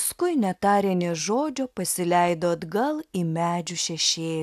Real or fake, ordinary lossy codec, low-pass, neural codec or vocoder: real; AAC, 96 kbps; 14.4 kHz; none